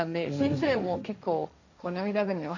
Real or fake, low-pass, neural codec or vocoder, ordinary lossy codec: fake; 7.2 kHz; codec, 16 kHz, 1.1 kbps, Voila-Tokenizer; none